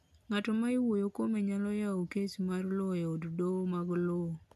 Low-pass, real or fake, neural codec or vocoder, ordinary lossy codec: 14.4 kHz; real; none; none